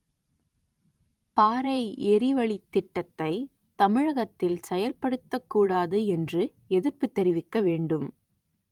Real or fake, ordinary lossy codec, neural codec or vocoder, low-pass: fake; Opus, 32 kbps; vocoder, 44.1 kHz, 128 mel bands every 512 samples, BigVGAN v2; 19.8 kHz